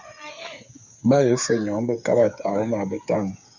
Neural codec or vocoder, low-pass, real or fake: codec, 16 kHz, 8 kbps, FreqCodec, smaller model; 7.2 kHz; fake